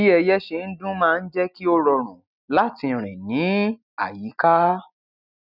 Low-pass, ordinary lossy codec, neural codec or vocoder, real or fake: 5.4 kHz; none; none; real